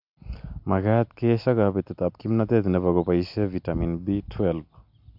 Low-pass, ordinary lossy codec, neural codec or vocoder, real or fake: 5.4 kHz; MP3, 48 kbps; none; real